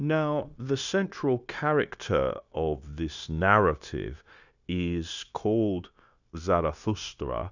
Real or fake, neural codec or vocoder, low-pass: fake; codec, 16 kHz, 0.9 kbps, LongCat-Audio-Codec; 7.2 kHz